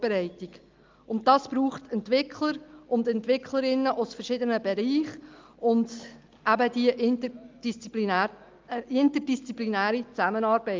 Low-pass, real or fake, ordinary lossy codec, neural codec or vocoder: 7.2 kHz; real; Opus, 24 kbps; none